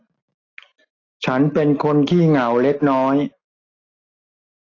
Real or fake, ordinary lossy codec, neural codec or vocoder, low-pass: real; AAC, 32 kbps; none; 7.2 kHz